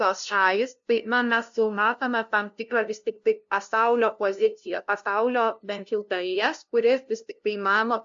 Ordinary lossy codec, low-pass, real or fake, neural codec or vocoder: AAC, 64 kbps; 7.2 kHz; fake; codec, 16 kHz, 0.5 kbps, FunCodec, trained on LibriTTS, 25 frames a second